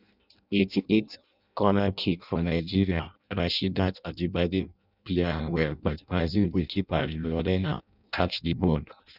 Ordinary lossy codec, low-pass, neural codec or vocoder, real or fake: none; 5.4 kHz; codec, 16 kHz in and 24 kHz out, 0.6 kbps, FireRedTTS-2 codec; fake